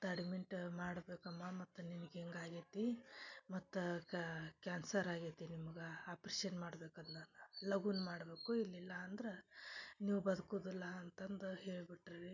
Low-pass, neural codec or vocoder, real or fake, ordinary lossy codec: 7.2 kHz; none; real; none